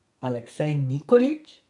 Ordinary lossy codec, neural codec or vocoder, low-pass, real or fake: MP3, 64 kbps; codec, 32 kHz, 1.9 kbps, SNAC; 10.8 kHz; fake